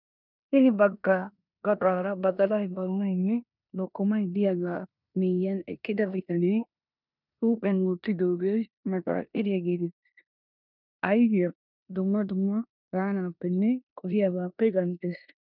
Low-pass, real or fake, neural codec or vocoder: 5.4 kHz; fake; codec, 16 kHz in and 24 kHz out, 0.9 kbps, LongCat-Audio-Codec, four codebook decoder